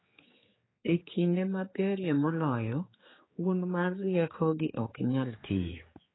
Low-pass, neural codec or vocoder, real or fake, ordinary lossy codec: 7.2 kHz; codec, 16 kHz, 4 kbps, X-Codec, HuBERT features, trained on general audio; fake; AAC, 16 kbps